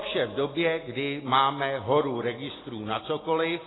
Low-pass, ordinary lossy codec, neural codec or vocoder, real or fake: 7.2 kHz; AAC, 16 kbps; none; real